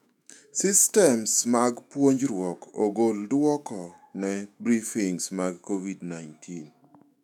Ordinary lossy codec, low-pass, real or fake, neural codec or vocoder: none; 19.8 kHz; fake; autoencoder, 48 kHz, 128 numbers a frame, DAC-VAE, trained on Japanese speech